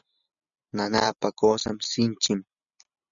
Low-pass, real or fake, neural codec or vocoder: 7.2 kHz; real; none